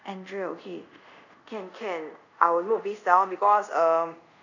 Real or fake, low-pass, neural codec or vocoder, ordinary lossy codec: fake; 7.2 kHz; codec, 24 kHz, 0.5 kbps, DualCodec; none